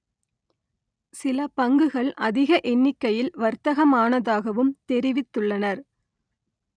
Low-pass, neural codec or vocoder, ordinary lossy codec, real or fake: 9.9 kHz; none; none; real